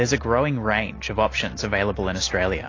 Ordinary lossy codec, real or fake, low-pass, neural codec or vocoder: AAC, 32 kbps; real; 7.2 kHz; none